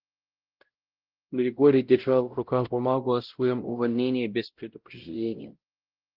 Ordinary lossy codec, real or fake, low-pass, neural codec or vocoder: Opus, 16 kbps; fake; 5.4 kHz; codec, 16 kHz, 0.5 kbps, X-Codec, WavLM features, trained on Multilingual LibriSpeech